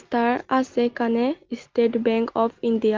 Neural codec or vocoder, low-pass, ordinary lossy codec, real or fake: none; 7.2 kHz; Opus, 32 kbps; real